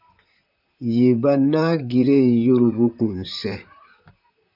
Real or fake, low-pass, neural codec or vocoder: fake; 5.4 kHz; vocoder, 44.1 kHz, 128 mel bands, Pupu-Vocoder